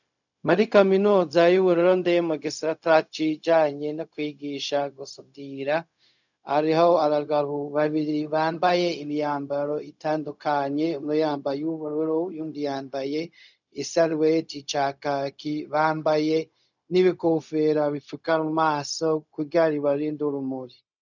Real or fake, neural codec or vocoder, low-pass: fake; codec, 16 kHz, 0.4 kbps, LongCat-Audio-Codec; 7.2 kHz